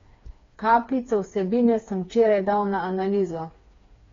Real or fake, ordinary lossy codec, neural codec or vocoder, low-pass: fake; AAC, 32 kbps; codec, 16 kHz, 4 kbps, FreqCodec, smaller model; 7.2 kHz